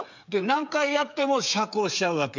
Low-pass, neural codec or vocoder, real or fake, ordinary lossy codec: 7.2 kHz; codec, 16 kHz, 8 kbps, FreqCodec, smaller model; fake; MP3, 64 kbps